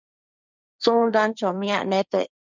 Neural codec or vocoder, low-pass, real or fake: codec, 16 kHz, 1.1 kbps, Voila-Tokenizer; 7.2 kHz; fake